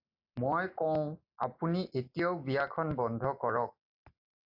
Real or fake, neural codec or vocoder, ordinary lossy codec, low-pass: real; none; AAC, 48 kbps; 5.4 kHz